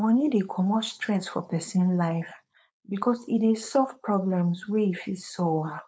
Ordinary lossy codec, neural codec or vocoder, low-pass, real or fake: none; codec, 16 kHz, 4.8 kbps, FACodec; none; fake